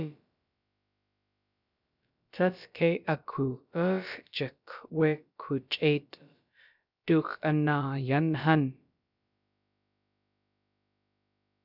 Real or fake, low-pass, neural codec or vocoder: fake; 5.4 kHz; codec, 16 kHz, about 1 kbps, DyCAST, with the encoder's durations